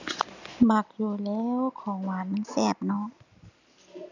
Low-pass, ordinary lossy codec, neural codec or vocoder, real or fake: 7.2 kHz; none; none; real